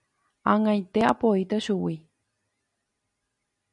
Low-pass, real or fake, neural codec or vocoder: 10.8 kHz; real; none